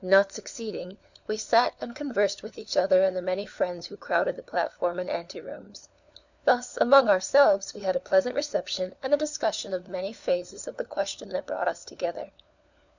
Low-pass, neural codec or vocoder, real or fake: 7.2 kHz; codec, 16 kHz, 4 kbps, FunCodec, trained on LibriTTS, 50 frames a second; fake